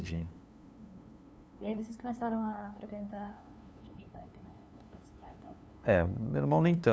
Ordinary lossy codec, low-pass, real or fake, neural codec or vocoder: none; none; fake; codec, 16 kHz, 2 kbps, FunCodec, trained on LibriTTS, 25 frames a second